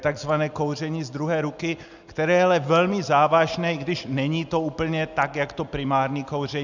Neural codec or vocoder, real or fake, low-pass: none; real; 7.2 kHz